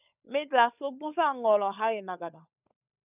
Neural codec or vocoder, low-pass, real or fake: codec, 16 kHz, 16 kbps, FunCodec, trained on LibriTTS, 50 frames a second; 3.6 kHz; fake